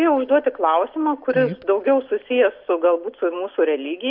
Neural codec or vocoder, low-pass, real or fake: none; 5.4 kHz; real